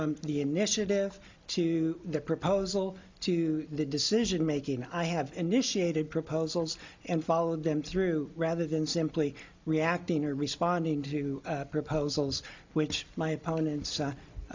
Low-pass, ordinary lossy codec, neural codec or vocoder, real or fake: 7.2 kHz; MP3, 48 kbps; none; real